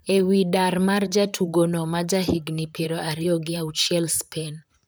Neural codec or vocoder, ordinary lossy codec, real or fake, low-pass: vocoder, 44.1 kHz, 128 mel bands, Pupu-Vocoder; none; fake; none